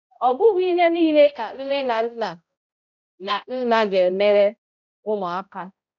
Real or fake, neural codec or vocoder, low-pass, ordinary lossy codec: fake; codec, 16 kHz, 0.5 kbps, X-Codec, HuBERT features, trained on balanced general audio; 7.2 kHz; none